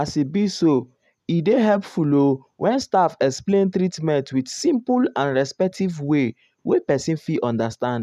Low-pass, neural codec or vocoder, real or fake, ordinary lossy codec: 14.4 kHz; none; real; none